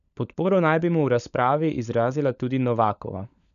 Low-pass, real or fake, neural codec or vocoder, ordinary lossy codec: 7.2 kHz; fake; codec, 16 kHz, 16 kbps, FunCodec, trained on LibriTTS, 50 frames a second; none